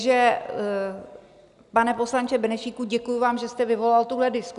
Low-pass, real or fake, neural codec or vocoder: 10.8 kHz; real; none